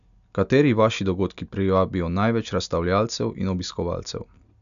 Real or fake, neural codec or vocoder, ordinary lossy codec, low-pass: real; none; none; 7.2 kHz